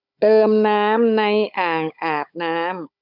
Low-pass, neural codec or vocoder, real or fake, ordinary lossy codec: 5.4 kHz; codec, 16 kHz, 16 kbps, FunCodec, trained on Chinese and English, 50 frames a second; fake; none